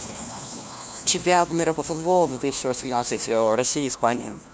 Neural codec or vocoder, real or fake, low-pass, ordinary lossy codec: codec, 16 kHz, 1 kbps, FunCodec, trained on LibriTTS, 50 frames a second; fake; none; none